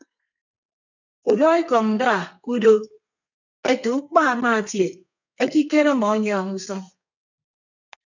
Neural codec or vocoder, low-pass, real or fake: codec, 32 kHz, 1.9 kbps, SNAC; 7.2 kHz; fake